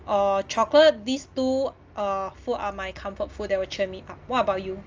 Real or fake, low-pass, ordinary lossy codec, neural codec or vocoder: real; 7.2 kHz; Opus, 24 kbps; none